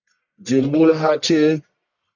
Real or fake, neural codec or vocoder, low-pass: fake; codec, 44.1 kHz, 1.7 kbps, Pupu-Codec; 7.2 kHz